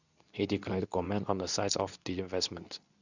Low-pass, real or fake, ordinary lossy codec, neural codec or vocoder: 7.2 kHz; fake; none; codec, 24 kHz, 0.9 kbps, WavTokenizer, medium speech release version 2